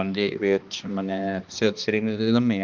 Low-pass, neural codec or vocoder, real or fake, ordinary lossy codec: 7.2 kHz; codec, 16 kHz, 2 kbps, X-Codec, HuBERT features, trained on balanced general audio; fake; Opus, 24 kbps